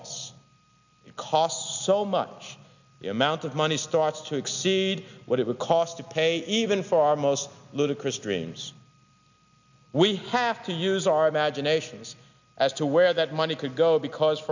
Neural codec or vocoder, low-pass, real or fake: none; 7.2 kHz; real